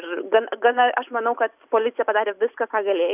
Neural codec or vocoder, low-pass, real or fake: none; 3.6 kHz; real